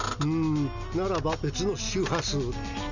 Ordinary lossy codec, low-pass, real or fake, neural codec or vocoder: none; 7.2 kHz; real; none